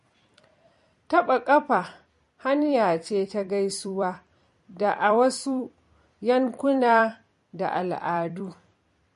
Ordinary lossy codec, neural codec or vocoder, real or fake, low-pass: MP3, 48 kbps; vocoder, 44.1 kHz, 128 mel bands every 256 samples, BigVGAN v2; fake; 14.4 kHz